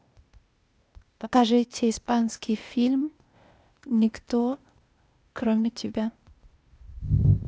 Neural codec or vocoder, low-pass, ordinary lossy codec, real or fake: codec, 16 kHz, 0.8 kbps, ZipCodec; none; none; fake